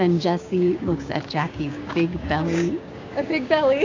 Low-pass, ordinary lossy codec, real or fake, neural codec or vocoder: 7.2 kHz; AAC, 48 kbps; fake; codec, 16 kHz, 6 kbps, DAC